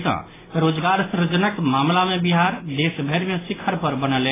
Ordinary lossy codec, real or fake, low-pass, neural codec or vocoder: AAC, 16 kbps; real; 3.6 kHz; none